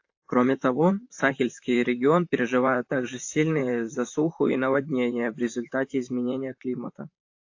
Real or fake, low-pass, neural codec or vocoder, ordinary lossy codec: fake; 7.2 kHz; vocoder, 44.1 kHz, 128 mel bands, Pupu-Vocoder; AAC, 48 kbps